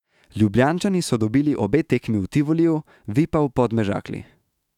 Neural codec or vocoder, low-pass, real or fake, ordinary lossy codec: autoencoder, 48 kHz, 128 numbers a frame, DAC-VAE, trained on Japanese speech; 19.8 kHz; fake; none